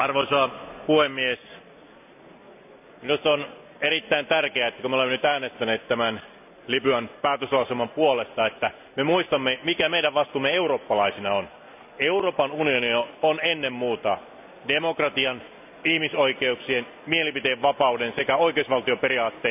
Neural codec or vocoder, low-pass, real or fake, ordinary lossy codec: none; 3.6 kHz; real; none